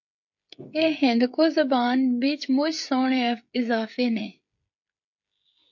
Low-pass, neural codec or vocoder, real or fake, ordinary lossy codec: 7.2 kHz; codec, 16 kHz, 16 kbps, FreqCodec, smaller model; fake; MP3, 48 kbps